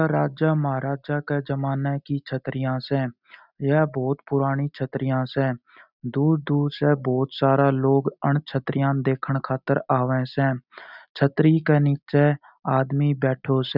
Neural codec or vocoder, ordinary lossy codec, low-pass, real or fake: none; none; 5.4 kHz; real